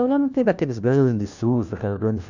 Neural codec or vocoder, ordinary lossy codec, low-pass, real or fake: codec, 16 kHz, 1 kbps, FunCodec, trained on LibriTTS, 50 frames a second; none; 7.2 kHz; fake